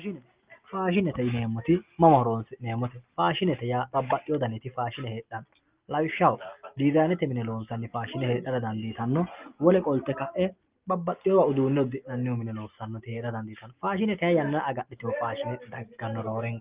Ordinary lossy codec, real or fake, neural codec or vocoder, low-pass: Opus, 24 kbps; real; none; 3.6 kHz